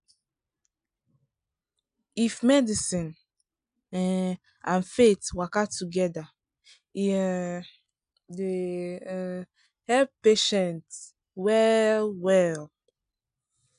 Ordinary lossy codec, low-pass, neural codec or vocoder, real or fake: none; 9.9 kHz; none; real